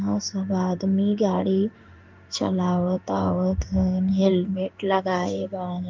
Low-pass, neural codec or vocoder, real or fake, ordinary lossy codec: 7.2 kHz; codec, 16 kHz in and 24 kHz out, 1 kbps, XY-Tokenizer; fake; Opus, 32 kbps